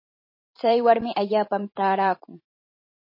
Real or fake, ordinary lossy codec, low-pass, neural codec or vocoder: fake; MP3, 24 kbps; 5.4 kHz; vocoder, 44.1 kHz, 128 mel bands every 512 samples, BigVGAN v2